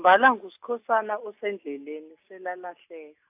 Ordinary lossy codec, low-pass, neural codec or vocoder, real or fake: AAC, 32 kbps; 3.6 kHz; none; real